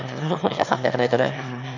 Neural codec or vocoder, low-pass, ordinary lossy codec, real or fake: autoencoder, 22.05 kHz, a latent of 192 numbers a frame, VITS, trained on one speaker; 7.2 kHz; none; fake